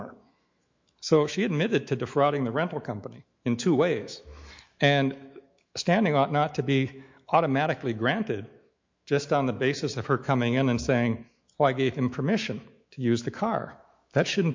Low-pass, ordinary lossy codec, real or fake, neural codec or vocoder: 7.2 kHz; MP3, 48 kbps; fake; autoencoder, 48 kHz, 128 numbers a frame, DAC-VAE, trained on Japanese speech